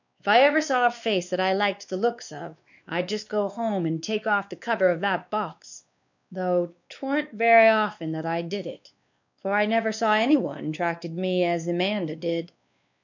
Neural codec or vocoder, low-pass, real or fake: codec, 16 kHz, 2 kbps, X-Codec, WavLM features, trained on Multilingual LibriSpeech; 7.2 kHz; fake